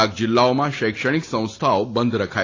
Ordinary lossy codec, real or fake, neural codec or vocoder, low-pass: AAC, 32 kbps; real; none; 7.2 kHz